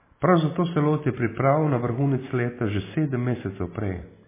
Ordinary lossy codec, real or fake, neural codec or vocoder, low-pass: MP3, 16 kbps; real; none; 3.6 kHz